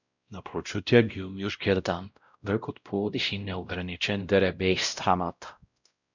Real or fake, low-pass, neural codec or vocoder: fake; 7.2 kHz; codec, 16 kHz, 0.5 kbps, X-Codec, WavLM features, trained on Multilingual LibriSpeech